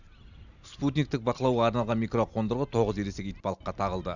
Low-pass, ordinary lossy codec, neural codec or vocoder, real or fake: 7.2 kHz; none; none; real